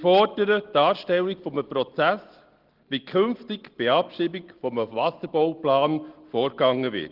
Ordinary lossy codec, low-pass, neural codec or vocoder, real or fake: Opus, 16 kbps; 5.4 kHz; none; real